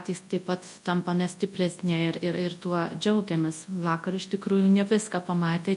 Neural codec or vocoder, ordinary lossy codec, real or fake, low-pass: codec, 24 kHz, 0.9 kbps, WavTokenizer, large speech release; MP3, 48 kbps; fake; 10.8 kHz